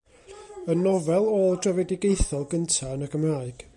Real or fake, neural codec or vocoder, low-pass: real; none; 10.8 kHz